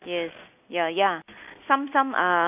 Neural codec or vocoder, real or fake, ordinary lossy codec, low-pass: none; real; none; 3.6 kHz